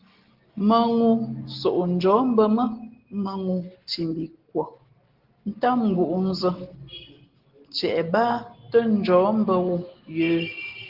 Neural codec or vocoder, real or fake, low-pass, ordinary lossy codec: none; real; 5.4 kHz; Opus, 16 kbps